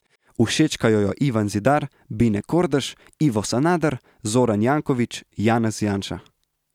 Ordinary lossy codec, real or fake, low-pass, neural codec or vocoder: none; real; 19.8 kHz; none